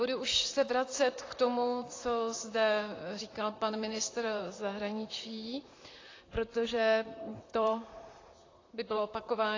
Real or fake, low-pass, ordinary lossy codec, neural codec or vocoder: fake; 7.2 kHz; AAC, 32 kbps; vocoder, 44.1 kHz, 128 mel bands, Pupu-Vocoder